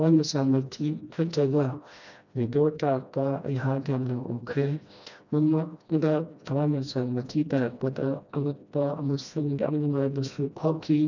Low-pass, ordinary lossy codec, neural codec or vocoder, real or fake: 7.2 kHz; none; codec, 16 kHz, 1 kbps, FreqCodec, smaller model; fake